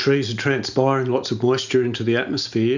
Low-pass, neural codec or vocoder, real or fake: 7.2 kHz; none; real